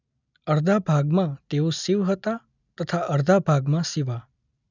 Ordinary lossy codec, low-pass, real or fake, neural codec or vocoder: none; 7.2 kHz; real; none